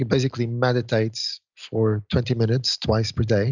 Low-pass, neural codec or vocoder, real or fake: 7.2 kHz; none; real